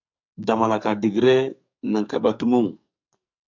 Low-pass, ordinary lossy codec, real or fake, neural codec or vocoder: 7.2 kHz; MP3, 64 kbps; fake; codec, 44.1 kHz, 2.6 kbps, SNAC